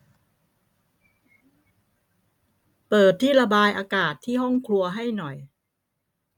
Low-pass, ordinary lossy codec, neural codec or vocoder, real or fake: 19.8 kHz; none; none; real